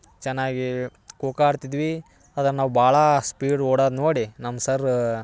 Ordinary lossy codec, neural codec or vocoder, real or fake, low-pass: none; none; real; none